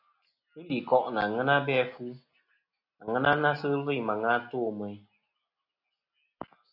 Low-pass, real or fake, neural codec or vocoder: 5.4 kHz; real; none